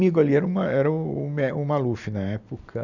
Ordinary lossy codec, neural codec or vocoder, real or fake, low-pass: none; none; real; 7.2 kHz